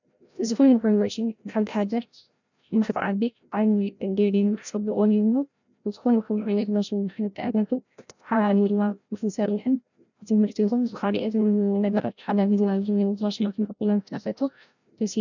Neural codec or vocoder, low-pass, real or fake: codec, 16 kHz, 0.5 kbps, FreqCodec, larger model; 7.2 kHz; fake